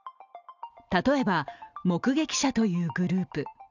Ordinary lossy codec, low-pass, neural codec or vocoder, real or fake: none; 7.2 kHz; none; real